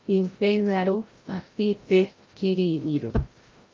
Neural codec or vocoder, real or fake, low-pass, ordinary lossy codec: codec, 16 kHz, 0.5 kbps, FreqCodec, larger model; fake; 7.2 kHz; Opus, 32 kbps